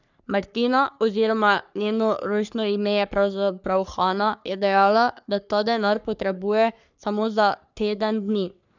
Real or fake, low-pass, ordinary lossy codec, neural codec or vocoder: fake; 7.2 kHz; none; codec, 44.1 kHz, 3.4 kbps, Pupu-Codec